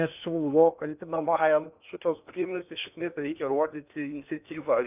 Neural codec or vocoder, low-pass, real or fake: codec, 16 kHz in and 24 kHz out, 0.8 kbps, FocalCodec, streaming, 65536 codes; 3.6 kHz; fake